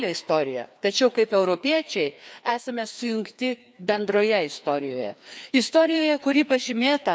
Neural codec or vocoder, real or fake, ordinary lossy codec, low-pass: codec, 16 kHz, 2 kbps, FreqCodec, larger model; fake; none; none